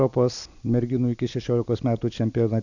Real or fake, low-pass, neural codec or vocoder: real; 7.2 kHz; none